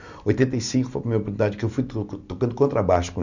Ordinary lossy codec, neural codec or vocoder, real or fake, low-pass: none; none; real; 7.2 kHz